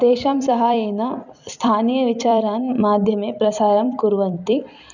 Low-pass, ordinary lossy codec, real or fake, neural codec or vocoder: 7.2 kHz; none; fake; vocoder, 44.1 kHz, 128 mel bands every 256 samples, BigVGAN v2